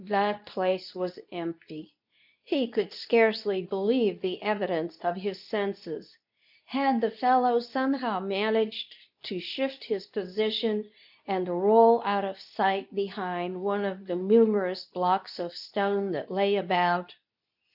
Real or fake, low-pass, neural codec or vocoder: fake; 5.4 kHz; codec, 24 kHz, 0.9 kbps, WavTokenizer, medium speech release version 2